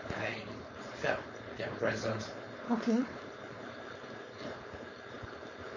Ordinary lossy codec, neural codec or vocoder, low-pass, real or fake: MP3, 32 kbps; codec, 16 kHz, 4.8 kbps, FACodec; 7.2 kHz; fake